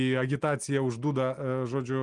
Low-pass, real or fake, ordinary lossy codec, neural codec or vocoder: 9.9 kHz; real; Opus, 24 kbps; none